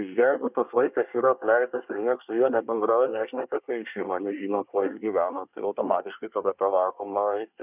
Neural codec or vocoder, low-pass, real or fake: codec, 24 kHz, 1 kbps, SNAC; 3.6 kHz; fake